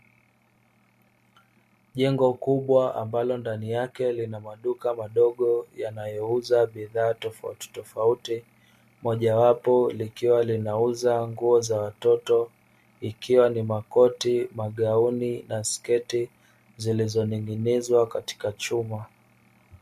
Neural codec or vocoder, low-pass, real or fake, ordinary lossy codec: none; 14.4 kHz; real; MP3, 64 kbps